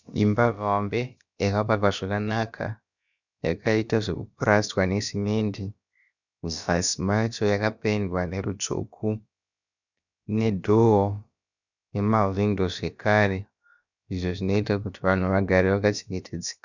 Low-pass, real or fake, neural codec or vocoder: 7.2 kHz; fake; codec, 16 kHz, about 1 kbps, DyCAST, with the encoder's durations